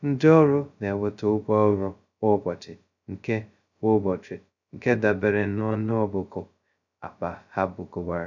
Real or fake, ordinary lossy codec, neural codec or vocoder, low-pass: fake; none; codec, 16 kHz, 0.2 kbps, FocalCodec; 7.2 kHz